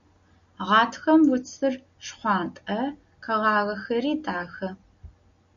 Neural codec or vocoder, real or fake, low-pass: none; real; 7.2 kHz